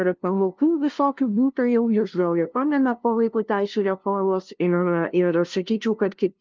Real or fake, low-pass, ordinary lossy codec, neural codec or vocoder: fake; 7.2 kHz; Opus, 32 kbps; codec, 16 kHz, 0.5 kbps, FunCodec, trained on LibriTTS, 25 frames a second